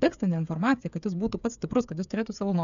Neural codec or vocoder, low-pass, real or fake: codec, 16 kHz, 8 kbps, FreqCodec, smaller model; 7.2 kHz; fake